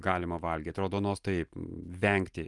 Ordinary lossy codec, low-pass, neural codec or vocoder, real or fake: Opus, 32 kbps; 10.8 kHz; none; real